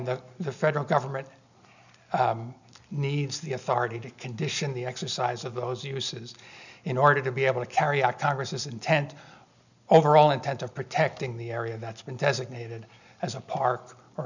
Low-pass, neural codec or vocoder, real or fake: 7.2 kHz; none; real